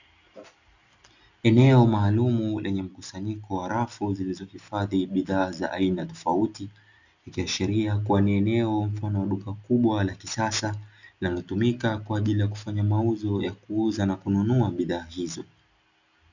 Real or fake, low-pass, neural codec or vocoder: real; 7.2 kHz; none